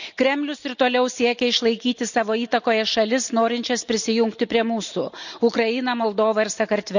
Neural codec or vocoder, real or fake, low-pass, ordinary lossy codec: none; real; 7.2 kHz; none